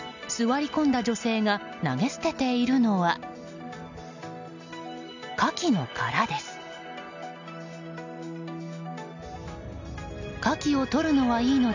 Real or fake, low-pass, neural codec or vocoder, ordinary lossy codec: real; 7.2 kHz; none; none